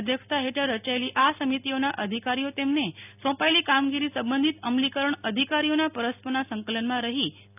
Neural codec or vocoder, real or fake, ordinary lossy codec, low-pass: none; real; none; 3.6 kHz